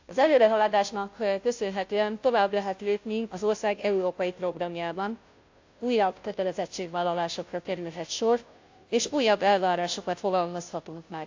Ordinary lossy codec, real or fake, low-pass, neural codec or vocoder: AAC, 48 kbps; fake; 7.2 kHz; codec, 16 kHz, 0.5 kbps, FunCodec, trained on Chinese and English, 25 frames a second